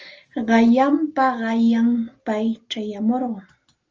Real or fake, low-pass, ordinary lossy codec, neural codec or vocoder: real; 7.2 kHz; Opus, 24 kbps; none